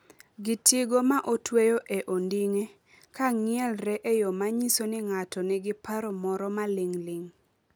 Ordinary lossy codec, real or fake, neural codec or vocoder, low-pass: none; real; none; none